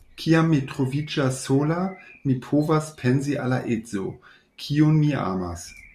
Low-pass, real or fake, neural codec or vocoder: 14.4 kHz; real; none